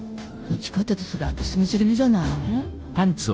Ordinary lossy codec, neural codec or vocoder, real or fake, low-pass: none; codec, 16 kHz, 0.5 kbps, FunCodec, trained on Chinese and English, 25 frames a second; fake; none